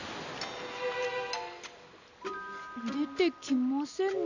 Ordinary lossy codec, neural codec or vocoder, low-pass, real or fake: none; none; 7.2 kHz; real